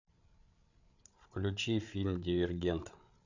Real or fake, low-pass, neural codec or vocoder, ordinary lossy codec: fake; 7.2 kHz; codec, 16 kHz, 8 kbps, FreqCodec, larger model; none